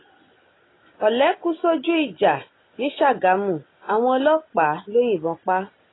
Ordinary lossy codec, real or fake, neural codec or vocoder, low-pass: AAC, 16 kbps; real; none; 7.2 kHz